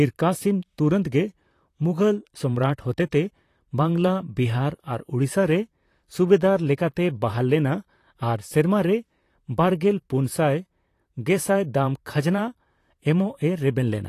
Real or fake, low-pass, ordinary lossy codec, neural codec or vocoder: fake; 14.4 kHz; AAC, 48 kbps; vocoder, 44.1 kHz, 128 mel bands, Pupu-Vocoder